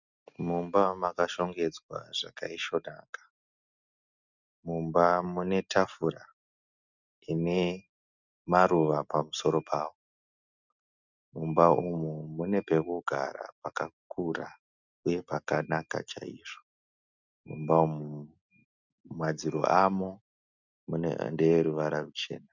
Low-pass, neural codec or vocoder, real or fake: 7.2 kHz; none; real